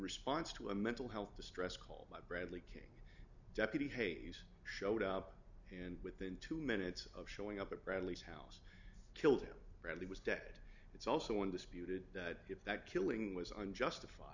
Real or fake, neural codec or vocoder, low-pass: real; none; 7.2 kHz